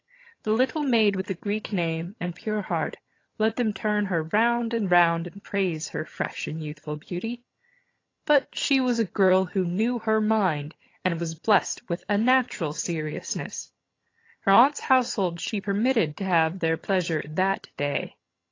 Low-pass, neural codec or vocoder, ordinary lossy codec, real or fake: 7.2 kHz; vocoder, 22.05 kHz, 80 mel bands, HiFi-GAN; AAC, 32 kbps; fake